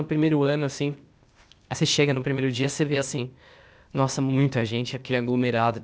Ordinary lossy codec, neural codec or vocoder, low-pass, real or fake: none; codec, 16 kHz, 0.8 kbps, ZipCodec; none; fake